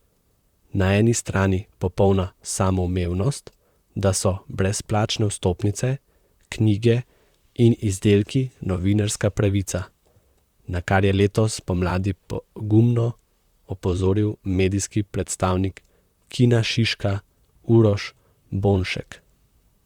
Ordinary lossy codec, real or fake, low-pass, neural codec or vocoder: Opus, 64 kbps; fake; 19.8 kHz; vocoder, 44.1 kHz, 128 mel bands, Pupu-Vocoder